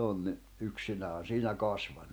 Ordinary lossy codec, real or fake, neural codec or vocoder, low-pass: none; real; none; none